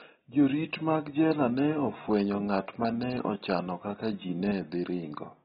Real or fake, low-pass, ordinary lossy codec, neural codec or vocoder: real; 19.8 kHz; AAC, 16 kbps; none